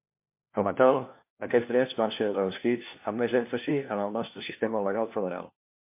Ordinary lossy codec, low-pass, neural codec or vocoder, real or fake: MP3, 24 kbps; 3.6 kHz; codec, 16 kHz, 1 kbps, FunCodec, trained on LibriTTS, 50 frames a second; fake